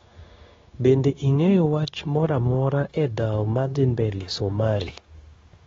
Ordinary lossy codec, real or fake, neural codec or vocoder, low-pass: AAC, 24 kbps; fake; codec, 16 kHz, 0.9 kbps, LongCat-Audio-Codec; 7.2 kHz